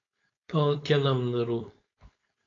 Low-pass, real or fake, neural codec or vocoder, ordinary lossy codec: 7.2 kHz; fake; codec, 16 kHz, 4.8 kbps, FACodec; AAC, 32 kbps